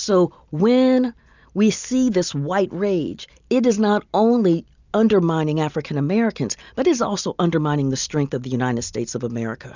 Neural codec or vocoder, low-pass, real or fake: none; 7.2 kHz; real